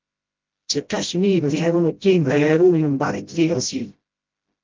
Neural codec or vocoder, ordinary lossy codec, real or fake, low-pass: codec, 16 kHz, 0.5 kbps, FreqCodec, smaller model; Opus, 32 kbps; fake; 7.2 kHz